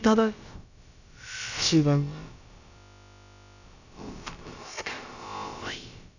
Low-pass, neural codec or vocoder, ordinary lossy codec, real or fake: 7.2 kHz; codec, 16 kHz, about 1 kbps, DyCAST, with the encoder's durations; none; fake